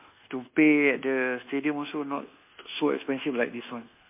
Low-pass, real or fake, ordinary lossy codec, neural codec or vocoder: 3.6 kHz; fake; MP3, 32 kbps; codec, 24 kHz, 1.2 kbps, DualCodec